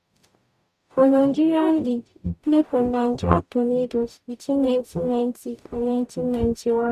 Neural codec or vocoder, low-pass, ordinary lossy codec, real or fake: codec, 44.1 kHz, 0.9 kbps, DAC; 14.4 kHz; AAC, 96 kbps; fake